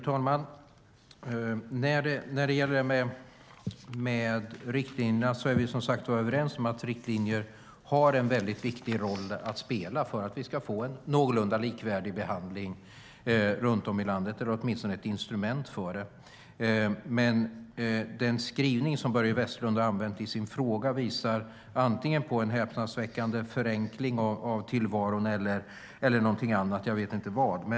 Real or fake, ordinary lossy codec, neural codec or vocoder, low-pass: real; none; none; none